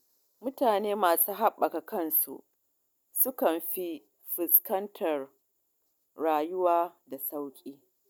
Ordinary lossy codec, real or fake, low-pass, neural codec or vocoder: none; real; none; none